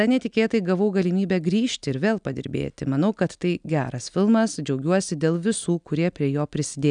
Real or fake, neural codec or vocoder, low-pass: real; none; 9.9 kHz